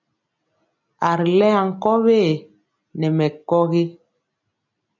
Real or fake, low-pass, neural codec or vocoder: real; 7.2 kHz; none